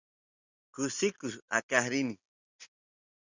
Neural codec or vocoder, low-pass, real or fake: none; 7.2 kHz; real